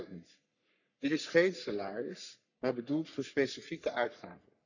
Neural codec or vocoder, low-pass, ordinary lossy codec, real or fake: codec, 44.1 kHz, 3.4 kbps, Pupu-Codec; 7.2 kHz; none; fake